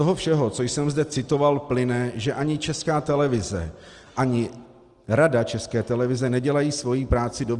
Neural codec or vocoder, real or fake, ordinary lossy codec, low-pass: none; real; Opus, 24 kbps; 10.8 kHz